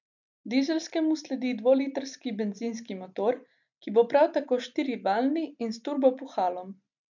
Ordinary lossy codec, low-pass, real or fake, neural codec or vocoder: none; 7.2 kHz; real; none